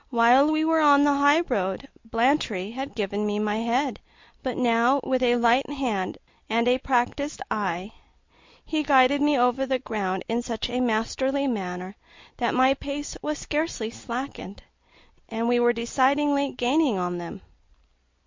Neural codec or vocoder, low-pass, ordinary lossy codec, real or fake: none; 7.2 kHz; MP3, 48 kbps; real